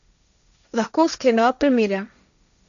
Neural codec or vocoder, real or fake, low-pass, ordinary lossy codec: codec, 16 kHz, 1.1 kbps, Voila-Tokenizer; fake; 7.2 kHz; none